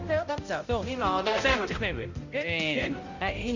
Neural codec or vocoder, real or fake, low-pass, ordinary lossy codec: codec, 16 kHz, 0.5 kbps, X-Codec, HuBERT features, trained on balanced general audio; fake; 7.2 kHz; Opus, 64 kbps